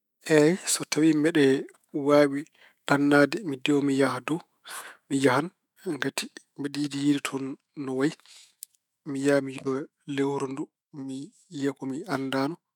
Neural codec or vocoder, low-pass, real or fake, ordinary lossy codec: autoencoder, 48 kHz, 128 numbers a frame, DAC-VAE, trained on Japanese speech; 19.8 kHz; fake; none